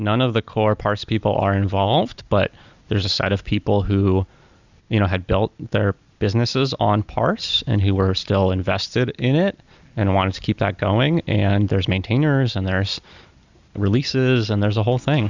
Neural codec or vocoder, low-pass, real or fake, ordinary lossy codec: none; 7.2 kHz; real; Opus, 64 kbps